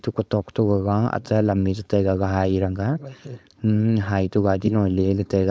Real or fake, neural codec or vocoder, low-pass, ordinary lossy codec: fake; codec, 16 kHz, 4.8 kbps, FACodec; none; none